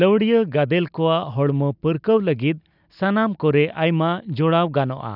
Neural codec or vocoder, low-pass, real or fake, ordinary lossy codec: none; 5.4 kHz; real; none